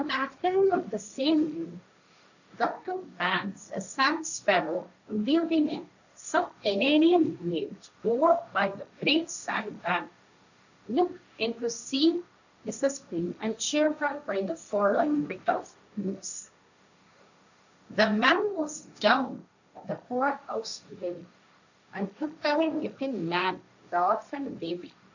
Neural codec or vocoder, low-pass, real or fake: codec, 16 kHz, 1.1 kbps, Voila-Tokenizer; 7.2 kHz; fake